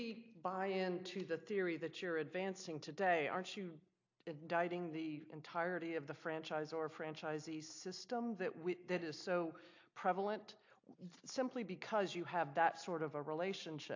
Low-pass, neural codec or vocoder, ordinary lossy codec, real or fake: 7.2 kHz; none; AAC, 48 kbps; real